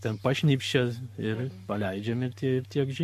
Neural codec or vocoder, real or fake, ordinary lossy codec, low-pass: codec, 44.1 kHz, 7.8 kbps, Pupu-Codec; fake; MP3, 64 kbps; 14.4 kHz